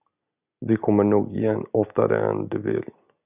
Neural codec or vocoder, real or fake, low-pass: none; real; 3.6 kHz